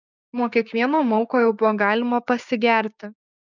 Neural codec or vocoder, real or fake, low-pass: codec, 16 kHz, 6 kbps, DAC; fake; 7.2 kHz